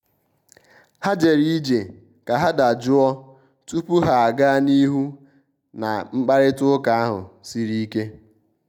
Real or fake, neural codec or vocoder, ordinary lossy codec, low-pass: real; none; none; 19.8 kHz